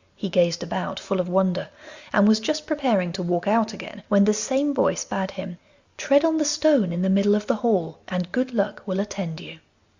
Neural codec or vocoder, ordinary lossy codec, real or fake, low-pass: none; Opus, 64 kbps; real; 7.2 kHz